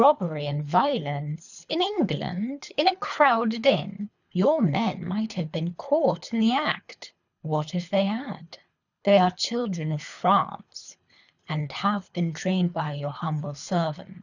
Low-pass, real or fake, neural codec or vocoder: 7.2 kHz; fake; codec, 24 kHz, 3 kbps, HILCodec